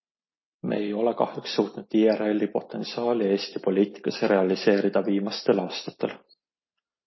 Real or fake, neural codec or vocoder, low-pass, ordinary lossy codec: real; none; 7.2 kHz; MP3, 24 kbps